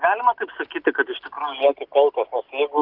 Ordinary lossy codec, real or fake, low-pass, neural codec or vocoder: Opus, 32 kbps; real; 5.4 kHz; none